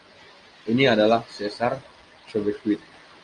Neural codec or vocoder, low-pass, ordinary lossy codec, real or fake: none; 9.9 kHz; Opus, 32 kbps; real